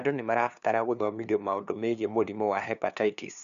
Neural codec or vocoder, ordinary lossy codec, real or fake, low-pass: codec, 16 kHz, 2 kbps, FunCodec, trained on LibriTTS, 25 frames a second; none; fake; 7.2 kHz